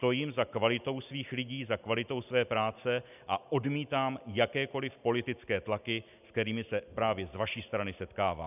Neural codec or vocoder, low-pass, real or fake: none; 3.6 kHz; real